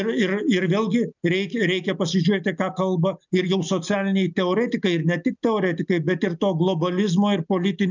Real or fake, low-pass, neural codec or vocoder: real; 7.2 kHz; none